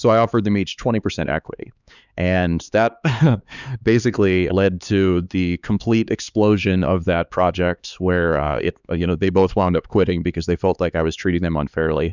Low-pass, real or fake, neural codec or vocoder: 7.2 kHz; fake; codec, 16 kHz, 4 kbps, X-Codec, HuBERT features, trained on LibriSpeech